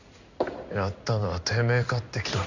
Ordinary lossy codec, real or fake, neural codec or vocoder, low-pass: Opus, 64 kbps; fake; codec, 16 kHz in and 24 kHz out, 1 kbps, XY-Tokenizer; 7.2 kHz